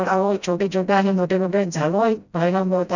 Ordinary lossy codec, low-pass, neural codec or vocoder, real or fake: none; 7.2 kHz; codec, 16 kHz, 0.5 kbps, FreqCodec, smaller model; fake